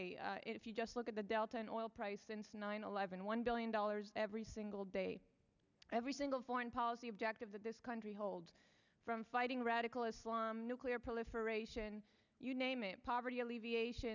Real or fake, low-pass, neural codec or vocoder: real; 7.2 kHz; none